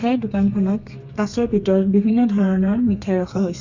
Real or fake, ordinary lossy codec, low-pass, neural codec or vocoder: fake; Opus, 64 kbps; 7.2 kHz; codec, 32 kHz, 1.9 kbps, SNAC